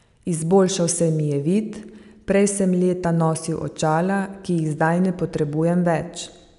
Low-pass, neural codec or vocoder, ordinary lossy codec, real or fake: 10.8 kHz; none; none; real